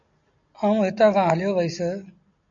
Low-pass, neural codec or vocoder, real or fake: 7.2 kHz; none; real